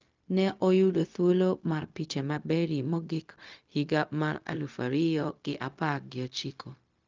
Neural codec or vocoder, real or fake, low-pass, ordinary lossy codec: codec, 16 kHz, 0.4 kbps, LongCat-Audio-Codec; fake; 7.2 kHz; Opus, 24 kbps